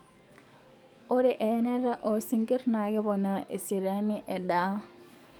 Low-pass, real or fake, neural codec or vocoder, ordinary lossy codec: 19.8 kHz; fake; codec, 44.1 kHz, 7.8 kbps, DAC; none